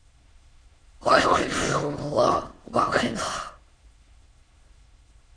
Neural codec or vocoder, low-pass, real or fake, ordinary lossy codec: autoencoder, 22.05 kHz, a latent of 192 numbers a frame, VITS, trained on many speakers; 9.9 kHz; fake; AAC, 32 kbps